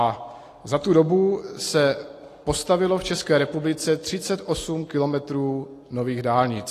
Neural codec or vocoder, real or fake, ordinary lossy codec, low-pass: none; real; AAC, 48 kbps; 14.4 kHz